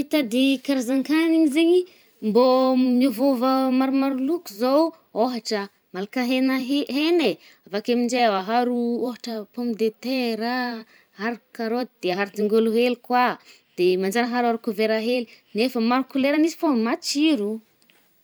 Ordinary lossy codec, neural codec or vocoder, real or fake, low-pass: none; vocoder, 44.1 kHz, 128 mel bands every 512 samples, BigVGAN v2; fake; none